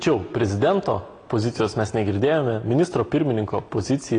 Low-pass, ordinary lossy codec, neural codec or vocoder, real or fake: 9.9 kHz; AAC, 32 kbps; none; real